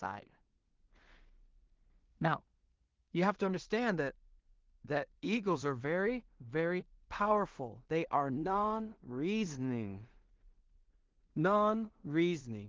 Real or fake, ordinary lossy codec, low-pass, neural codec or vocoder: fake; Opus, 16 kbps; 7.2 kHz; codec, 16 kHz in and 24 kHz out, 0.4 kbps, LongCat-Audio-Codec, two codebook decoder